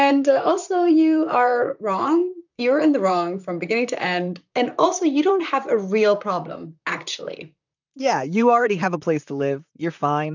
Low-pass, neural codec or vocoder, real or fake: 7.2 kHz; vocoder, 44.1 kHz, 128 mel bands, Pupu-Vocoder; fake